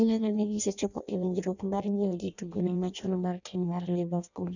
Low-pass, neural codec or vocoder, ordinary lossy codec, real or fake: 7.2 kHz; codec, 16 kHz in and 24 kHz out, 0.6 kbps, FireRedTTS-2 codec; none; fake